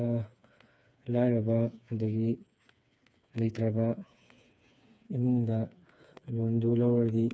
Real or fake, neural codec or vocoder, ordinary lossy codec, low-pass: fake; codec, 16 kHz, 4 kbps, FreqCodec, smaller model; none; none